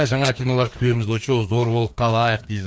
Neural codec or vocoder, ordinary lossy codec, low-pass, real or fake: codec, 16 kHz, 2 kbps, FreqCodec, larger model; none; none; fake